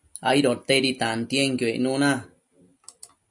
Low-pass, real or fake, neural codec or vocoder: 10.8 kHz; real; none